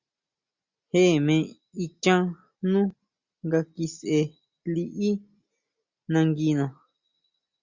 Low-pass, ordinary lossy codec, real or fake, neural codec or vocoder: 7.2 kHz; Opus, 64 kbps; real; none